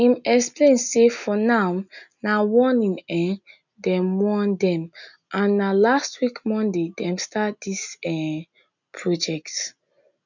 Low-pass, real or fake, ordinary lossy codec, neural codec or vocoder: 7.2 kHz; real; none; none